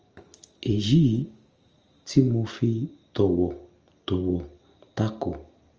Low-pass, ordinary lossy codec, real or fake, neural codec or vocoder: 7.2 kHz; Opus, 24 kbps; real; none